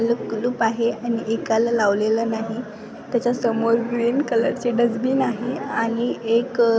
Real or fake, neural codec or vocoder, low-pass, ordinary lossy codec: real; none; none; none